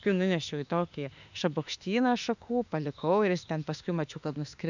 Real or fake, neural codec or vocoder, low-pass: fake; autoencoder, 48 kHz, 32 numbers a frame, DAC-VAE, trained on Japanese speech; 7.2 kHz